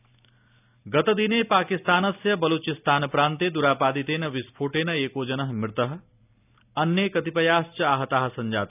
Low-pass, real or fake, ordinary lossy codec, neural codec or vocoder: 3.6 kHz; real; none; none